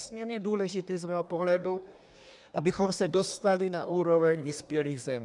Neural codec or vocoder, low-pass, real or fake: codec, 24 kHz, 1 kbps, SNAC; 10.8 kHz; fake